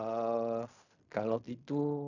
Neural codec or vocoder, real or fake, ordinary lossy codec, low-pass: codec, 16 kHz in and 24 kHz out, 0.4 kbps, LongCat-Audio-Codec, fine tuned four codebook decoder; fake; none; 7.2 kHz